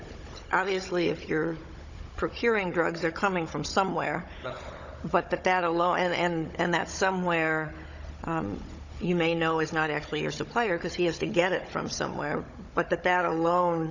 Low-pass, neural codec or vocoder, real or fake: 7.2 kHz; codec, 16 kHz, 16 kbps, FunCodec, trained on Chinese and English, 50 frames a second; fake